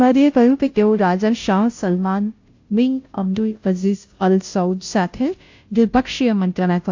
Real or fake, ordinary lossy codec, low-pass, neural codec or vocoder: fake; AAC, 48 kbps; 7.2 kHz; codec, 16 kHz, 0.5 kbps, FunCodec, trained on Chinese and English, 25 frames a second